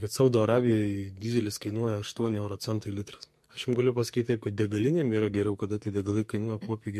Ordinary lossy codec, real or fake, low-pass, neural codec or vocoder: MP3, 64 kbps; fake; 14.4 kHz; codec, 44.1 kHz, 2.6 kbps, SNAC